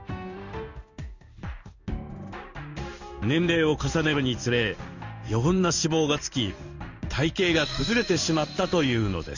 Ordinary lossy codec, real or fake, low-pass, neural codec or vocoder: none; fake; 7.2 kHz; codec, 16 kHz in and 24 kHz out, 1 kbps, XY-Tokenizer